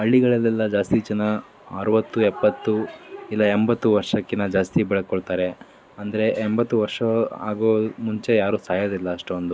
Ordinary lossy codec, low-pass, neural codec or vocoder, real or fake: none; none; none; real